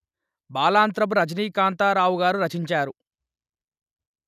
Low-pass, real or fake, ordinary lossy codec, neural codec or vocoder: 14.4 kHz; real; none; none